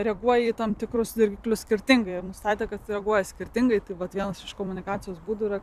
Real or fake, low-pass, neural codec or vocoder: fake; 14.4 kHz; vocoder, 44.1 kHz, 128 mel bands every 256 samples, BigVGAN v2